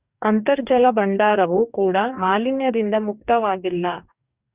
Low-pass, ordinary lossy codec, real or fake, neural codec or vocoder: 3.6 kHz; Opus, 64 kbps; fake; codec, 44.1 kHz, 2.6 kbps, DAC